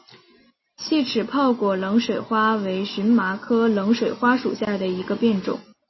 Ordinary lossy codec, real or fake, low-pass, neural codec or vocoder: MP3, 24 kbps; real; 7.2 kHz; none